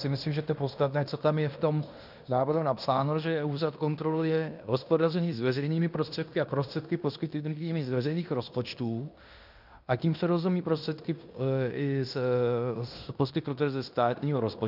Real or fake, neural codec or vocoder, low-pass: fake; codec, 16 kHz in and 24 kHz out, 0.9 kbps, LongCat-Audio-Codec, fine tuned four codebook decoder; 5.4 kHz